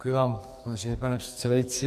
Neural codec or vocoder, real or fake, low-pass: codec, 44.1 kHz, 2.6 kbps, SNAC; fake; 14.4 kHz